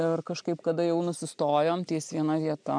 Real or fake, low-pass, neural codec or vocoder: real; 9.9 kHz; none